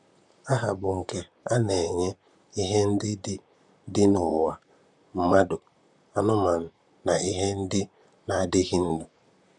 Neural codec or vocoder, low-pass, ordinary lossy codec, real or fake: vocoder, 44.1 kHz, 128 mel bands, Pupu-Vocoder; 10.8 kHz; none; fake